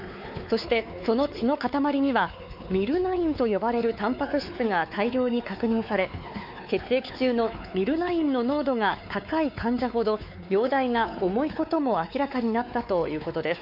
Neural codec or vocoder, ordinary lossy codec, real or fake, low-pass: codec, 16 kHz, 4 kbps, X-Codec, WavLM features, trained on Multilingual LibriSpeech; none; fake; 5.4 kHz